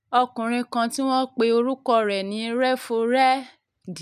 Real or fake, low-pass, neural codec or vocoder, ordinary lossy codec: real; 14.4 kHz; none; none